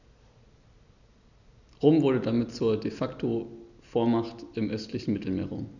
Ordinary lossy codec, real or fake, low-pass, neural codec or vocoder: none; real; 7.2 kHz; none